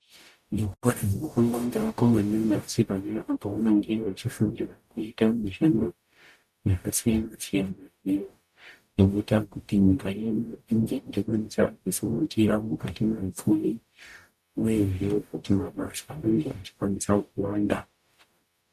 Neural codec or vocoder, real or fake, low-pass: codec, 44.1 kHz, 0.9 kbps, DAC; fake; 14.4 kHz